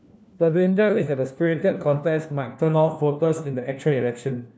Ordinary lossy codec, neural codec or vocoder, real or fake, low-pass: none; codec, 16 kHz, 1 kbps, FunCodec, trained on LibriTTS, 50 frames a second; fake; none